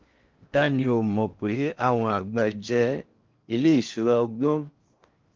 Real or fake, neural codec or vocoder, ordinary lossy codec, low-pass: fake; codec, 16 kHz in and 24 kHz out, 0.6 kbps, FocalCodec, streaming, 4096 codes; Opus, 32 kbps; 7.2 kHz